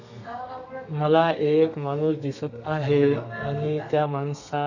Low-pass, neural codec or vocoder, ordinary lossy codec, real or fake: 7.2 kHz; codec, 32 kHz, 1.9 kbps, SNAC; none; fake